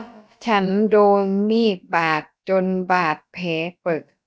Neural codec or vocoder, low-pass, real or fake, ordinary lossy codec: codec, 16 kHz, about 1 kbps, DyCAST, with the encoder's durations; none; fake; none